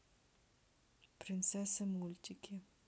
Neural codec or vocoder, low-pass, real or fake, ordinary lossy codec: none; none; real; none